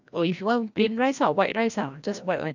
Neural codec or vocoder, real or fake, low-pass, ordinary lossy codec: codec, 16 kHz, 1 kbps, FreqCodec, larger model; fake; 7.2 kHz; none